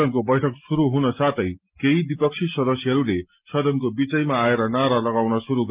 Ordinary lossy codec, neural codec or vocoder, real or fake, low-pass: Opus, 32 kbps; none; real; 3.6 kHz